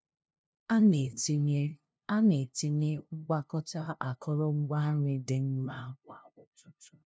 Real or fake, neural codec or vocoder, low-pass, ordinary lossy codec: fake; codec, 16 kHz, 0.5 kbps, FunCodec, trained on LibriTTS, 25 frames a second; none; none